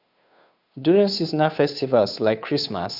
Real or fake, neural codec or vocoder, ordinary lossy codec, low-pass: fake; codec, 16 kHz, 2 kbps, FunCodec, trained on Chinese and English, 25 frames a second; none; 5.4 kHz